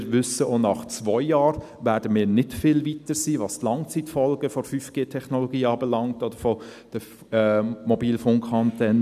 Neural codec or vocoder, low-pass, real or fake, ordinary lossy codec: none; 14.4 kHz; real; none